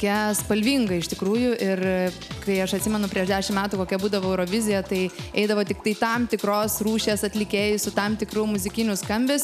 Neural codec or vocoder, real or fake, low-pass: none; real; 14.4 kHz